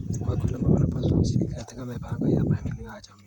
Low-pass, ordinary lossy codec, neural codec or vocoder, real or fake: 19.8 kHz; none; vocoder, 44.1 kHz, 128 mel bands, Pupu-Vocoder; fake